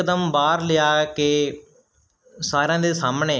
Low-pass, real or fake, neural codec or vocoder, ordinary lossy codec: none; real; none; none